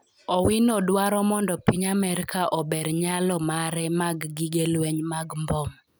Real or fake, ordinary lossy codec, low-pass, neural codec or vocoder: real; none; none; none